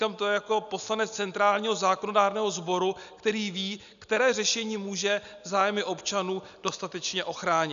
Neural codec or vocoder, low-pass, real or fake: none; 7.2 kHz; real